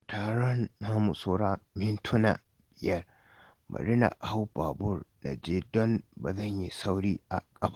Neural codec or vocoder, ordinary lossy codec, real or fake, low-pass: none; Opus, 16 kbps; real; 19.8 kHz